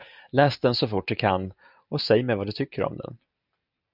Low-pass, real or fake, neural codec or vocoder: 5.4 kHz; real; none